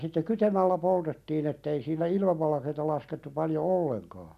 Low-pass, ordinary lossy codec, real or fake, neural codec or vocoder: 14.4 kHz; none; real; none